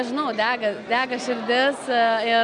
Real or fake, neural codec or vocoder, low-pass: real; none; 9.9 kHz